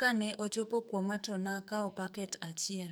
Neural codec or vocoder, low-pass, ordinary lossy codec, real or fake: codec, 44.1 kHz, 2.6 kbps, SNAC; none; none; fake